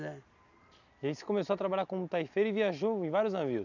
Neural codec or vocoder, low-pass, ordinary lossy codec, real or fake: none; 7.2 kHz; none; real